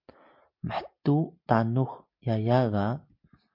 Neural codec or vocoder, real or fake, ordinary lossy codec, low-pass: none; real; MP3, 48 kbps; 5.4 kHz